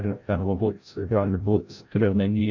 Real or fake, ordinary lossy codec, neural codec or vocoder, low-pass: fake; MP3, 48 kbps; codec, 16 kHz, 0.5 kbps, FreqCodec, larger model; 7.2 kHz